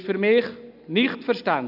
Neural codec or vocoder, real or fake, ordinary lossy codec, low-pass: none; real; none; 5.4 kHz